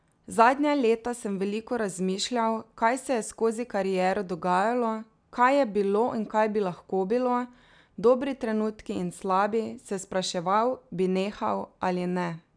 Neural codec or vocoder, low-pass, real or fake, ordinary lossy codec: none; 9.9 kHz; real; none